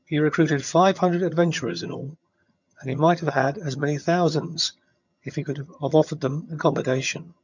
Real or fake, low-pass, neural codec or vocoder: fake; 7.2 kHz; vocoder, 22.05 kHz, 80 mel bands, HiFi-GAN